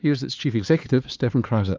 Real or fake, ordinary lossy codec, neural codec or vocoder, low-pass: fake; Opus, 32 kbps; codec, 16 kHz, 4 kbps, X-Codec, HuBERT features, trained on LibriSpeech; 7.2 kHz